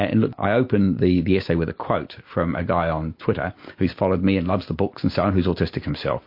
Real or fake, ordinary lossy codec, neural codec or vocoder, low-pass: real; MP3, 32 kbps; none; 5.4 kHz